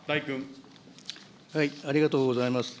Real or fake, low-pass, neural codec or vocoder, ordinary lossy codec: real; none; none; none